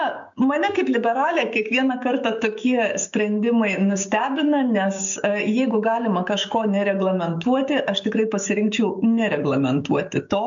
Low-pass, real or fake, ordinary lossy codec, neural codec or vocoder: 7.2 kHz; fake; MP3, 64 kbps; codec, 16 kHz, 6 kbps, DAC